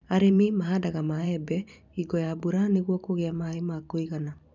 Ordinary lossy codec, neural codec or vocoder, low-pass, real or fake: none; vocoder, 44.1 kHz, 128 mel bands every 512 samples, BigVGAN v2; 7.2 kHz; fake